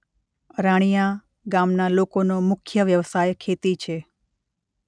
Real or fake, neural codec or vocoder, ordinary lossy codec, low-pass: real; none; none; 9.9 kHz